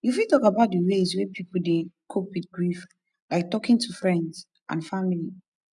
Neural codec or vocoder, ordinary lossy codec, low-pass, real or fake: none; none; 10.8 kHz; real